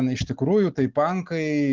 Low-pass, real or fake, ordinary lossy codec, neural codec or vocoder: 7.2 kHz; real; Opus, 16 kbps; none